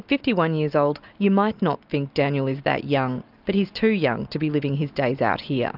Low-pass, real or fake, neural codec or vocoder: 5.4 kHz; real; none